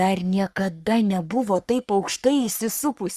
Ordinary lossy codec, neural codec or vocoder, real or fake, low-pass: Opus, 64 kbps; codec, 44.1 kHz, 3.4 kbps, Pupu-Codec; fake; 14.4 kHz